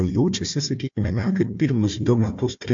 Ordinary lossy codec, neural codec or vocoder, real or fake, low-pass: MP3, 48 kbps; codec, 16 kHz, 1 kbps, FunCodec, trained on Chinese and English, 50 frames a second; fake; 7.2 kHz